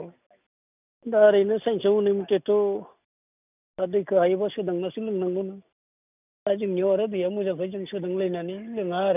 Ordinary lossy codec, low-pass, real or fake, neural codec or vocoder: none; 3.6 kHz; real; none